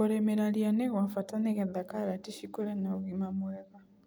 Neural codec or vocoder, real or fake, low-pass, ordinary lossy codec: none; real; none; none